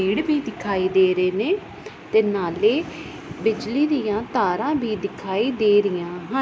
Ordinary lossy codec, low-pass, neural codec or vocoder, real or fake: none; none; none; real